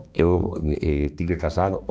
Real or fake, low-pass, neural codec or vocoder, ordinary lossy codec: fake; none; codec, 16 kHz, 2 kbps, X-Codec, HuBERT features, trained on balanced general audio; none